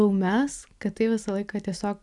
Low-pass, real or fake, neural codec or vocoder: 10.8 kHz; real; none